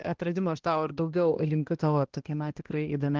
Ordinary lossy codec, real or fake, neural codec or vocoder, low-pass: Opus, 16 kbps; fake; codec, 16 kHz, 1 kbps, X-Codec, HuBERT features, trained on balanced general audio; 7.2 kHz